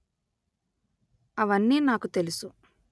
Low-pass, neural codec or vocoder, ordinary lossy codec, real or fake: none; none; none; real